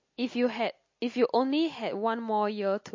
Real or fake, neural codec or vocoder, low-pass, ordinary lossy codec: real; none; 7.2 kHz; MP3, 32 kbps